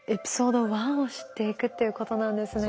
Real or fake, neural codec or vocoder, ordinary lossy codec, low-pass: real; none; none; none